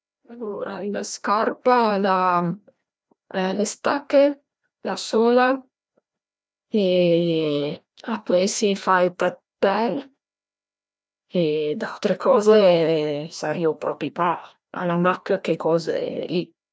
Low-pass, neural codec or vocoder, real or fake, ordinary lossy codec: none; codec, 16 kHz, 1 kbps, FreqCodec, larger model; fake; none